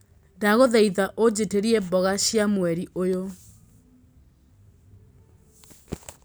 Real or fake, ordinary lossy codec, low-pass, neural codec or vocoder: real; none; none; none